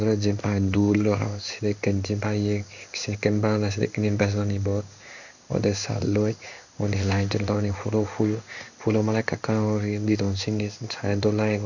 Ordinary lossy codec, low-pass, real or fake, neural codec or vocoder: none; 7.2 kHz; fake; codec, 16 kHz in and 24 kHz out, 1 kbps, XY-Tokenizer